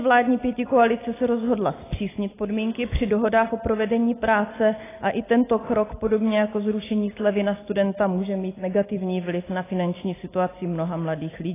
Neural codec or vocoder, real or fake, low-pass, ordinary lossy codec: none; real; 3.6 kHz; AAC, 16 kbps